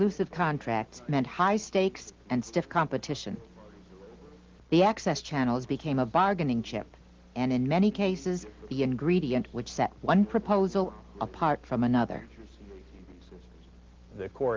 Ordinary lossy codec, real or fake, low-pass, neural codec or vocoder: Opus, 16 kbps; real; 7.2 kHz; none